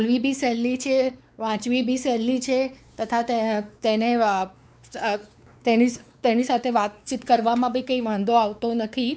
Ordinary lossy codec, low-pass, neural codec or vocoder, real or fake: none; none; codec, 16 kHz, 4 kbps, X-Codec, WavLM features, trained on Multilingual LibriSpeech; fake